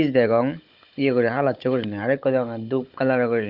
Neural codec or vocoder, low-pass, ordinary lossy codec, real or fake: codec, 16 kHz, 16 kbps, FunCodec, trained on Chinese and English, 50 frames a second; 5.4 kHz; Opus, 24 kbps; fake